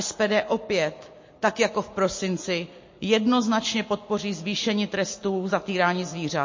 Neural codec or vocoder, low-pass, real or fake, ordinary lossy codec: none; 7.2 kHz; real; MP3, 32 kbps